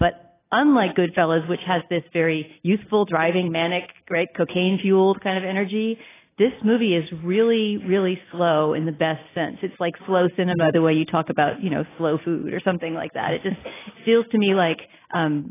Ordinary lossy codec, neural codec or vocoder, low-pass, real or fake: AAC, 16 kbps; none; 3.6 kHz; real